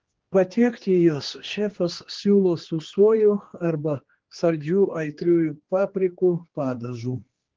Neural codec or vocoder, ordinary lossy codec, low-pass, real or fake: codec, 16 kHz, 2 kbps, X-Codec, HuBERT features, trained on general audio; Opus, 16 kbps; 7.2 kHz; fake